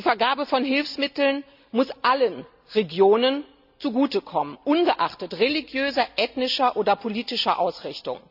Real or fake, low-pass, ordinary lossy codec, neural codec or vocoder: real; 5.4 kHz; none; none